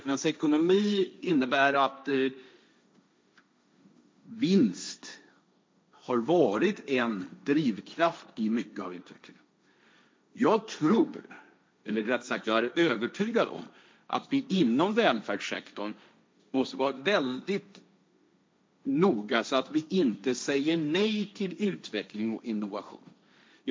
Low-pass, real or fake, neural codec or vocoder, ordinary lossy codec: none; fake; codec, 16 kHz, 1.1 kbps, Voila-Tokenizer; none